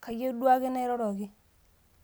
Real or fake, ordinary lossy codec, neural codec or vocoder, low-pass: real; none; none; none